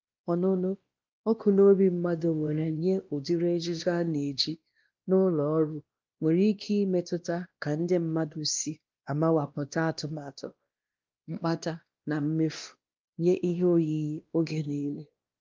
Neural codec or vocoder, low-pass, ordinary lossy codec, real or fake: codec, 16 kHz, 1 kbps, X-Codec, WavLM features, trained on Multilingual LibriSpeech; 7.2 kHz; Opus, 24 kbps; fake